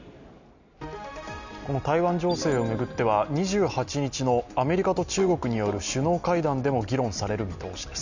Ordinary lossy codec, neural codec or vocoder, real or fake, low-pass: none; none; real; 7.2 kHz